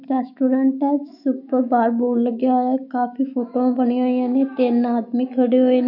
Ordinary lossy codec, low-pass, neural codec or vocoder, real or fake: none; 5.4 kHz; autoencoder, 48 kHz, 128 numbers a frame, DAC-VAE, trained on Japanese speech; fake